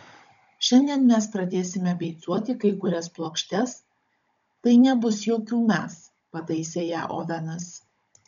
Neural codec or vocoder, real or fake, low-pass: codec, 16 kHz, 16 kbps, FunCodec, trained on Chinese and English, 50 frames a second; fake; 7.2 kHz